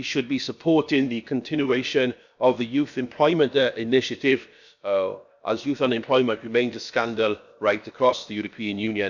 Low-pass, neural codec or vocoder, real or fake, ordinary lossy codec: 7.2 kHz; codec, 16 kHz, about 1 kbps, DyCAST, with the encoder's durations; fake; none